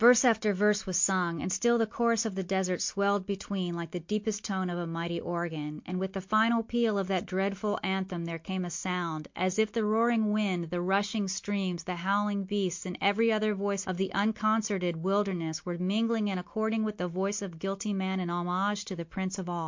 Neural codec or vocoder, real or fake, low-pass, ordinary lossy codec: none; real; 7.2 kHz; MP3, 48 kbps